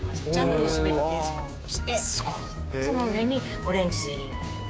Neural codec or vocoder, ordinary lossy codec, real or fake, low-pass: codec, 16 kHz, 6 kbps, DAC; none; fake; none